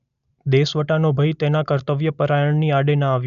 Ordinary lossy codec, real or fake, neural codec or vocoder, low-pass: none; real; none; 7.2 kHz